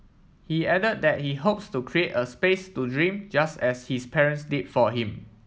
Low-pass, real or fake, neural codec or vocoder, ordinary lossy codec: none; real; none; none